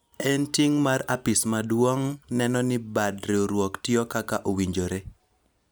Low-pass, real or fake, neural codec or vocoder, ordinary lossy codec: none; real; none; none